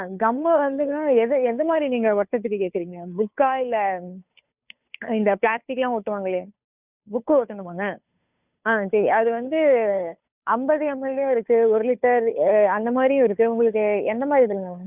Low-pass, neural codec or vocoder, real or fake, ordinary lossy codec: 3.6 kHz; codec, 16 kHz, 2 kbps, FunCodec, trained on Chinese and English, 25 frames a second; fake; none